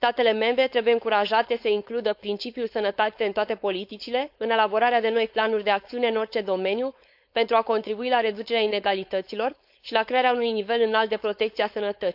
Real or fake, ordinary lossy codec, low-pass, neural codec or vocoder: fake; AAC, 48 kbps; 5.4 kHz; codec, 16 kHz, 4.8 kbps, FACodec